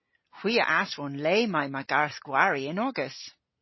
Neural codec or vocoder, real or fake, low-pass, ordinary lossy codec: none; real; 7.2 kHz; MP3, 24 kbps